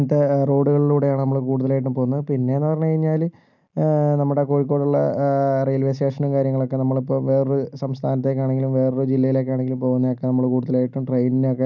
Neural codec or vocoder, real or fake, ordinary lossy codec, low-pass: none; real; none; 7.2 kHz